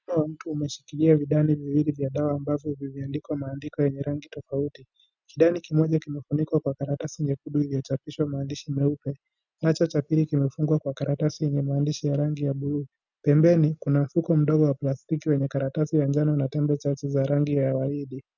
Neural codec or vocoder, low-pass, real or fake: none; 7.2 kHz; real